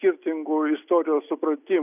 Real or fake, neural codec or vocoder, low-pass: real; none; 3.6 kHz